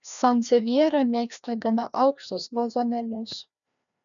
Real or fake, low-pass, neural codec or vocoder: fake; 7.2 kHz; codec, 16 kHz, 1 kbps, FreqCodec, larger model